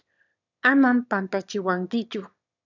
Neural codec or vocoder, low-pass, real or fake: autoencoder, 22.05 kHz, a latent of 192 numbers a frame, VITS, trained on one speaker; 7.2 kHz; fake